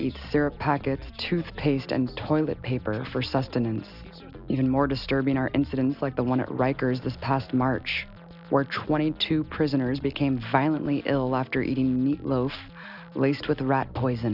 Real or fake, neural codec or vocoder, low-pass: real; none; 5.4 kHz